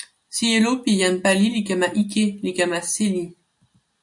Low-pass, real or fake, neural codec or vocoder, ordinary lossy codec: 10.8 kHz; real; none; MP3, 64 kbps